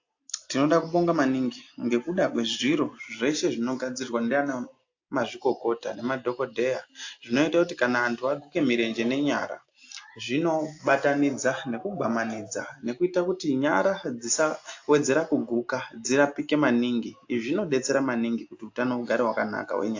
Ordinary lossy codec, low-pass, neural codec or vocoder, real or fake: AAC, 48 kbps; 7.2 kHz; none; real